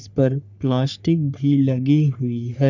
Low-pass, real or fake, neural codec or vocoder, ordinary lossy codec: 7.2 kHz; fake; codec, 44.1 kHz, 3.4 kbps, Pupu-Codec; none